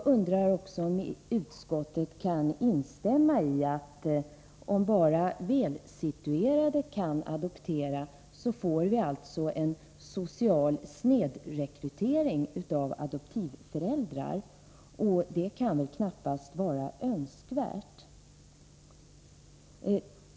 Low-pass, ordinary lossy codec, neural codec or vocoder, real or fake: none; none; none; real